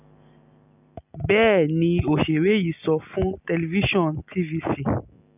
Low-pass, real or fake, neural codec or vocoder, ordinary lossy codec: 3.6 kHz; real; none; none